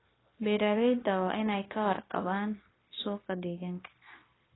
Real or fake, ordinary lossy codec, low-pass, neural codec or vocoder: fake; AAC, 16 kbps; 7.2 kHz; codec, 16 kHz, 0.9 kbps, LongCat-Audio-Codec